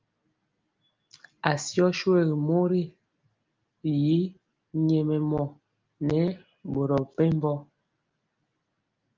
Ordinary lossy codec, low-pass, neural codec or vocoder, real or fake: Opus, 32 kbps; 7.2 kHz; none; real